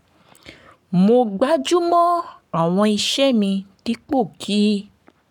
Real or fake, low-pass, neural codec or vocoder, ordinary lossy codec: fake; 19.8 kHz; codec, 44.1 kHz, 7.8 kbps, Pupu-Codec; none